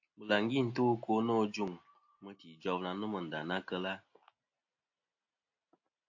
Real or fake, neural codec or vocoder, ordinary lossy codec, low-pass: real; none; AAC, 48 kbps; 7.2 kHz